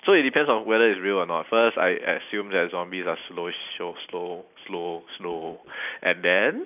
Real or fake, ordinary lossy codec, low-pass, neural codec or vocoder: real; none; 3.6 kHz; none